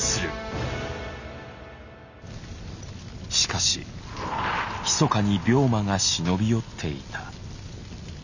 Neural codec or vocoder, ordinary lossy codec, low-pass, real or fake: none; none; 7.2 kHz; real